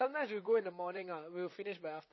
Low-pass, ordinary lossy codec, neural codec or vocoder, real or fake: 7.2 kHz; MP3, 24 kbps; vocoder, 44.1 kHz, 128 mel bands, Pupu-Vocoder; fake